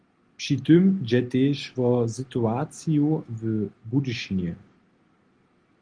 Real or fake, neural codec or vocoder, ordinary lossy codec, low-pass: real; none; Opus, 24 kbps; 9.9 kHz